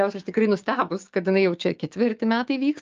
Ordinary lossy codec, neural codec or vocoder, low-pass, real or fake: Opus, 24 kbps; codec, 16 kHz, 6 kbps, DAC; 7.2 kHz; fake